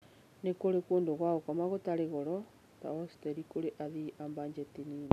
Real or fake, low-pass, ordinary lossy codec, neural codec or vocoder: real; 14.4 kHz; none; none